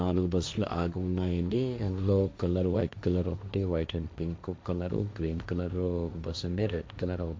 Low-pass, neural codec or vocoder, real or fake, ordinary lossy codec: none; codec, 16 kHz, 1.1 kbps, Voila-Tokenizer; fake; none